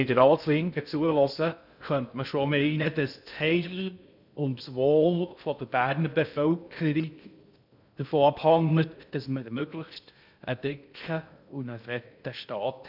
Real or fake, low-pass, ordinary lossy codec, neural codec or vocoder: fake; 5.4 kHz; none; codec, 16 kHz in and 24 kHz out, 0.6 kbps, FocalCodec, streaming, 2048 codes